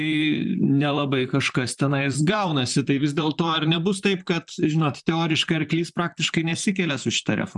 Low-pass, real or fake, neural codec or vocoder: 10.8 kHz; fake; vocoder, 44.1 kHz, 128 mel bands, Pupu-Vocoder